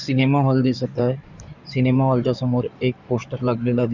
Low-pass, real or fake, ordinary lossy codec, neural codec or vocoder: 7.2 kHz; fake; none; codec, 16 kHz in and 24 kHz out, 2.2 kbps, FireRedTTS-2 codec